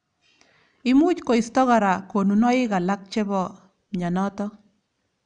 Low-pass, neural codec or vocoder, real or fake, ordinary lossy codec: 9.9 kHz; none; real; none